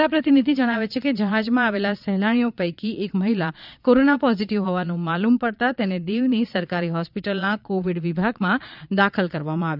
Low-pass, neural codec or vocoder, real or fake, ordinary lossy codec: 5.4 kHz; vocoder, 22.05 kHz, 80 mel bands, Vocos; fake; none